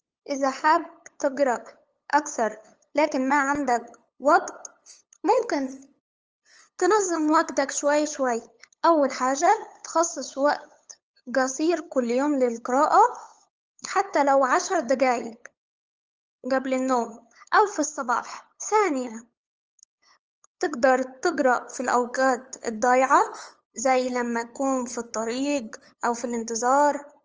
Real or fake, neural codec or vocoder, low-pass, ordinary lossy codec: fake; codec, 16 kHz, 8 kbps, FunCodec, trained on LibriTTS, 25 frames a second; 7.2 kHz; Opus, 24 kbps